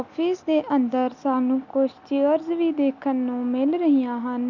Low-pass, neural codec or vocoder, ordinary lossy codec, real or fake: 7.2 kHz; none; MP3, 64 kbps; real